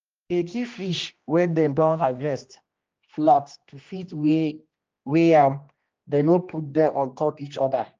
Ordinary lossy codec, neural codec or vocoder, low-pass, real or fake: Opus, 24 kbps; codec, 16 kHz, 1 kbps, X-Codec, HuBERT features, trained on general audio; 7.2 kHz; fake